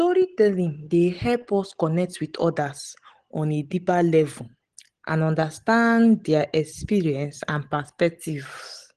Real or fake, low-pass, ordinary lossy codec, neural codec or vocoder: real; 9.9 kHz; Opus, 16 kbps; none